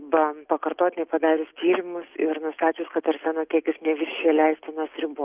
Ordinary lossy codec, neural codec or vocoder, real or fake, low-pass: Opus, 24 kbps; none; real; 3.6 kHz